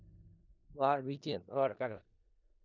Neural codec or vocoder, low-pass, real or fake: codec, 16 kHz in and 24 kHz out, 0.4 kbps, LongCat-Audio-Codec, four codebook decoder; 7.2 kHz; fake